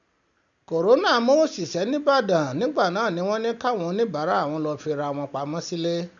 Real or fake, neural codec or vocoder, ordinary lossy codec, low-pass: real; none; none; 7.2 kHz